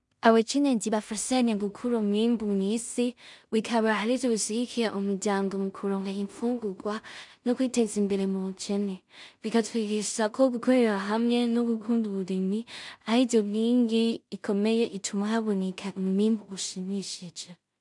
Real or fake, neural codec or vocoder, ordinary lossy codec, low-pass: fake; codec, 16 kHz in and 24 kHz out, 0.4 kbps, LongCat-Audio-Codec, two codebook decoder; MP3, 96 kbps; 10.8 kHz